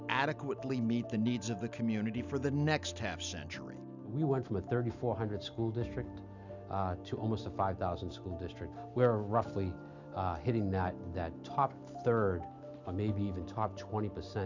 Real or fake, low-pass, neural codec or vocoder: real; 7.2 kHz; none